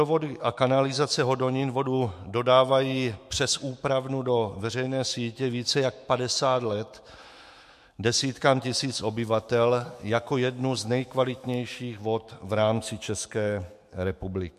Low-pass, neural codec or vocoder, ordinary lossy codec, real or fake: 14.4 kHz; autoencoder, 48 kHz, 128 numbers a frame, DAC-VAE, trained on Japanese speech; MP3, 64 kbps; fake